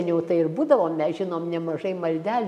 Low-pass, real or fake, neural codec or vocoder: 14.4 kHz; real; none